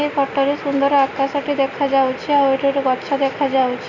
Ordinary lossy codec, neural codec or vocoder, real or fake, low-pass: none; none; real; 7.2 kHz